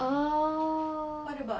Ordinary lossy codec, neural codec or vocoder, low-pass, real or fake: none; none; none; real